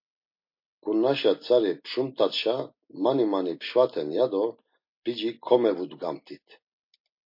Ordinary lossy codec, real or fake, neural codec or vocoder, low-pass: MP3, 32 kbps; real; none; 5.4 kHz